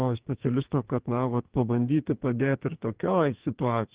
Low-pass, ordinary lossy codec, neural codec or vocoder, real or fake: 3.6 kHz; Opus, 16 kbps; codec, 44.1 kHz, 2.6 kbps, SNAC; fake